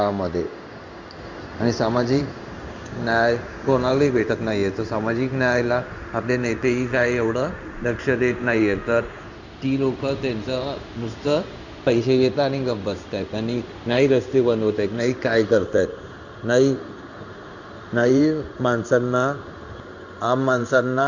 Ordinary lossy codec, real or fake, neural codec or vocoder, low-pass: none; fake; codec, 16 kHz in and 24 kHz out, 1 kbps, XY-Tokenizer; 7.2 kHz